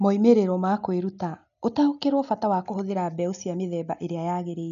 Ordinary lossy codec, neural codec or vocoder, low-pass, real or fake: none; none; 7.2 kHz; real